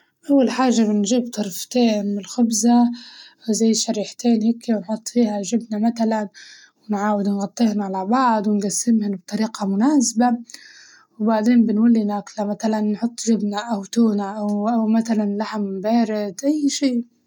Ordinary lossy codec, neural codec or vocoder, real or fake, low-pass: none; none; real; 19.8 kHz